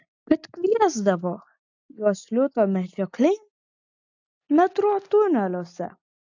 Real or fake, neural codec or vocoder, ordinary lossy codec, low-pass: real; none; AAC, 48 kbps; 7.2 kHz